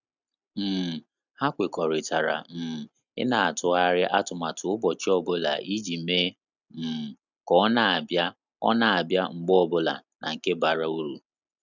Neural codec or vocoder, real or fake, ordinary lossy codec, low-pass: none; real; none; 7.2 kHz